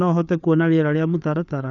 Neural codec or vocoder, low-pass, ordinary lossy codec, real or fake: codec, 16 kHz, 4 kbps, FreqCodec, larger model; 7.2 kHz; none; fake